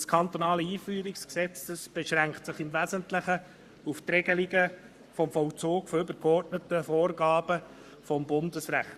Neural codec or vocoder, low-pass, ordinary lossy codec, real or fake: codec, 44.1 kHz, 7.8 kbps, Pupu-Codec; 14.4 kHz; Opus, 64 kbps; fake